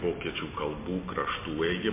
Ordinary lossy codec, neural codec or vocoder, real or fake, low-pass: MP3, 24 kbps; none; real; 3.6 kHz